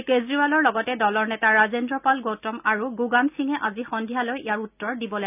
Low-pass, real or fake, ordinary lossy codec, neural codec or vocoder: 3.6 kHz; real; none; none